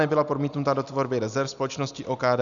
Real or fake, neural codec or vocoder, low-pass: real; none; 7.2 kHz